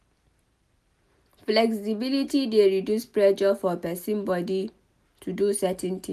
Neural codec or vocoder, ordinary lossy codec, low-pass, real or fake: none; none; 14.4 kHz; real